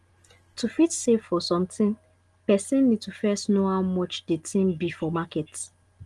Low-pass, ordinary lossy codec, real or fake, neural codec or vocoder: 10.8 kHz; Opus, 24 kbps; real; none